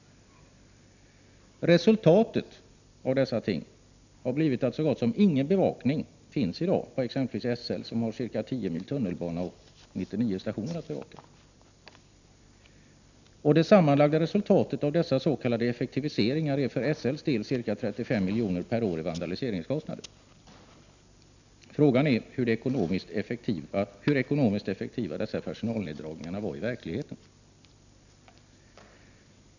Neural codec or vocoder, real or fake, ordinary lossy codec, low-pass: none; real; none; 7.2 kHz